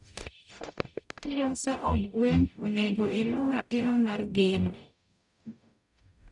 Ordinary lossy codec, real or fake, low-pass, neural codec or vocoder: none; fake; 10.8 kHz; codec, 44.1 kHz, 0.9 kbps, DAC